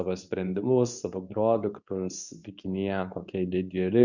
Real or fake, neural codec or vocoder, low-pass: fake; codec, 24 kHz, 0.9 kbps, WavTokenizer, medium speech release version 2; 7.2 kHz